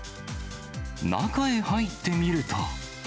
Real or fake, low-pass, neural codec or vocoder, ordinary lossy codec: real; none; none; none